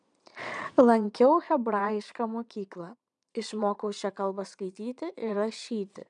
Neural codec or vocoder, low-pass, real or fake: vocoder, 22.05 kHz, 80 mel bands, Vocos; 9.9 kHz; fake